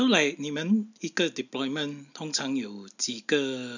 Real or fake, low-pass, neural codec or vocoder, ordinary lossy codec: real; 7.2 kHz; none; none